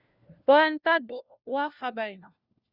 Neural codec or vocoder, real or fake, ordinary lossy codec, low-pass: codec, 16 kHz, 1 kbps, FunCodec, trained on LibriTTS, 50 frames a second; fake; Opus, 64 kbps; 5.4 kHz